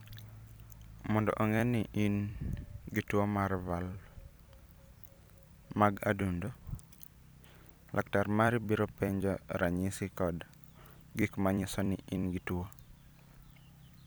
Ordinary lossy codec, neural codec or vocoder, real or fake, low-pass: none; vocoder, 44.1 kHz, 128 mel bands every 256 samples, BigVGAN v2; fake; none